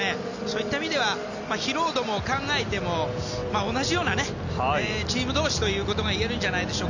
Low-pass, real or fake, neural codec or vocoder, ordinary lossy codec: 7.2 kHz; real; none; none